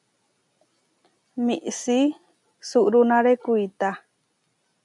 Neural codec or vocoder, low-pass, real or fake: none; 10.8 kHz; real